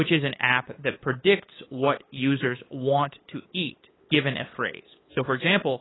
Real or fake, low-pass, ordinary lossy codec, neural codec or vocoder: fake; 7.2 kHz; AAC, 16 kbps; codec, 16 kHz, 8 kbps, FunCodec, trained on LibriTTS, 25 frames a second